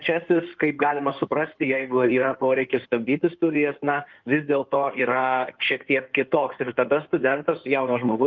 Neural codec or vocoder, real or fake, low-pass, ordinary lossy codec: codec, 16 kHz in and 24 kHz out, 2.2 kbps, FireRedTTS-2 codec; fake; 7.2 kHz; Opus, 32 kbps